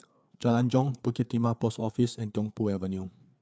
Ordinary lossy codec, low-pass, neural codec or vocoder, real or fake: none; none; codec, 16 kHz, 4 kbps, FreqCodec, larger model; fake